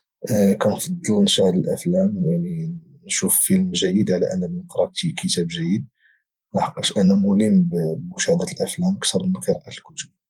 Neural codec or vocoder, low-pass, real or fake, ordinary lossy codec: vocoder, 44.1 kHz, 128 mel bands every 512 samples, BigVGAN v2; 19.8 kHz; fake; Opus, 32 kbps